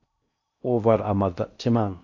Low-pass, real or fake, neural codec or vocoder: 7.2 kHz; fake; codec, 16 kHz in and 24 kHz out, 0.6 kbps, FocalCodec, streaming, 4096 codes